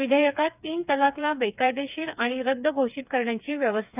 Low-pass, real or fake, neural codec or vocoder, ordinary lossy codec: 3.6 kHz; fake; codec, 16 kHz, 4 kbps, FreqCodec, smaller model; none